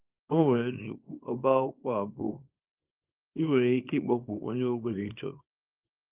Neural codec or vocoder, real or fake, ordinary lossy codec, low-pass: codec, 24 kHz, 0.9 kbps, WavTokenizer, small release; fake; Opus, 32 kbps; 3.6 kHz